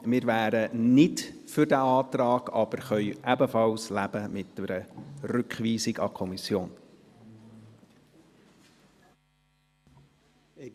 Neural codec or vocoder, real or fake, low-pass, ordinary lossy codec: none; real; 14.4 kHz; Opus, 64 kbps